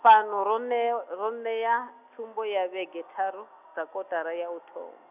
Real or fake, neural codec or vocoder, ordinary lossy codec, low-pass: real; none; none; 3.6 kHz